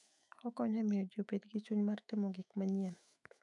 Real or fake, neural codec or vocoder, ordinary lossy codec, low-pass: fake; autoencoder, 48 kHz, 128 numbers a frame, DAC-VAE, trained on Japanese speech; none; 10.8 kHz